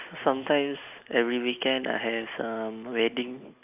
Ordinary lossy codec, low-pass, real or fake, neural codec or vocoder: none; 3.6 kHz; real; none